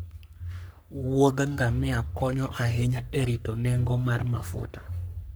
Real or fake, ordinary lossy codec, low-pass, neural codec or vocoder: fake; none; none; codec, 44.1 kHz, 3.4 kbps, Pupu-Codec